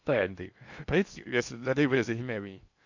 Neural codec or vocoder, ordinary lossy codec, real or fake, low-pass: codec, 16 kHz in and 24 kHz out, 0.6 kbps, FocalCodec, streaming, 2048 codes; none; fake; 7.2 kHz